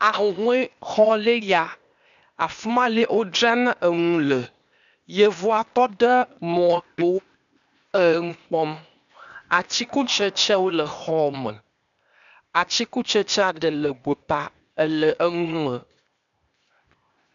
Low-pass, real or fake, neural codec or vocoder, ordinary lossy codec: 7.2 kHz; fake; codec, 16 kHz, 0.8 kbps, ZipCodec; MP3, 96 kbps